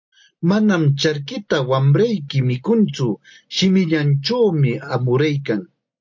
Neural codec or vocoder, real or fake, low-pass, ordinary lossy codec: none; real; 7.2 kHz; MP3, 48 kbps